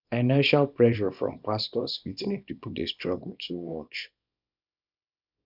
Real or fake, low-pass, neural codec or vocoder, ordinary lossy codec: fake; 5.4 kHz; codec, 24 kHz, 0.9 kbps, WavTokenizer, small release; none